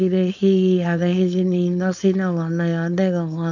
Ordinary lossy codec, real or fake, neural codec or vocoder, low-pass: none; fake; codec, 16 kHz, 4.8 kbps, FACodec; 7.2 kHz